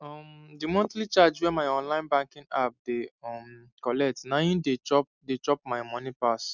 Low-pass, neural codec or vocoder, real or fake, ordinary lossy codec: 7.2 kHz; none; real; none